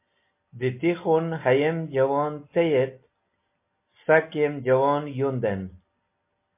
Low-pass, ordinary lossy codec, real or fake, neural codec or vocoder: 3.6 kHz; MP3, 24 kbps; real; none